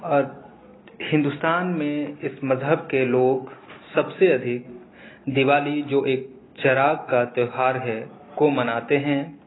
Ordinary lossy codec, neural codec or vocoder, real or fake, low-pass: AAC, 16 kbps; none; real; 7.2 kHz